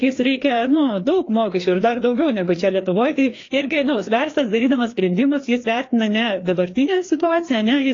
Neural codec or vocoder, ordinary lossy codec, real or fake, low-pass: codec, 16 kHz, 2 kbps, FreqCodec, larger model; AAC, 32 kbps; fake; 7.2 kHz